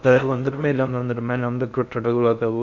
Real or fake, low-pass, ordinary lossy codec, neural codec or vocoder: fake; 7.2 kHz; none; codec, 16 kHz in and 24 kHz out, 0.6 kbps, FocalCodec, streaming, 4096 codes